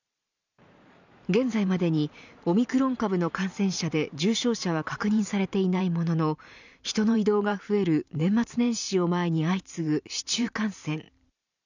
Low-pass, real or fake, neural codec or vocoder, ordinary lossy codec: 7.2 kHz; fake; vocoder, 44.1 kHz, 128 mel bands every 512 samples, BigVGAN v2; none